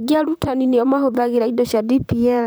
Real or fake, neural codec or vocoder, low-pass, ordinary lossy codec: fake; vocoder, 44.1 kHz, 128 mel bands, Pupu-Vocoder; none; none